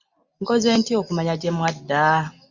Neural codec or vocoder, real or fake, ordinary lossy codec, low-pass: none; real; Opus, 64 kbps; 7.2 kHz